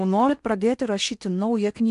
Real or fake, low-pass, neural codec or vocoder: fake; 10.8 kHz; codec, 16 kHz in and 24 kHz out, 0.8 kbps, FocalCodec, streaming, 65536 codes